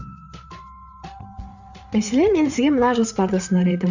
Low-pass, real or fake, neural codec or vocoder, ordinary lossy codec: 7.2 kHz; fake; codec, 16 kHz, 8 kbps, FreqCodec, larger model; none